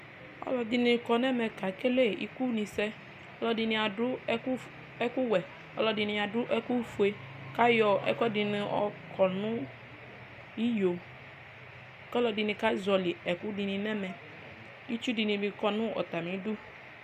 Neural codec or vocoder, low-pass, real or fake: none; 14.4 kHz; real